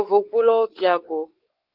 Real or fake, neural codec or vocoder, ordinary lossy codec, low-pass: fake; codec, 16 kHz, 4 kbps, X-Codec, WavLM features, trained on Multilingual LibriSpeech; Opus, 16 kbps; 5.4 kHz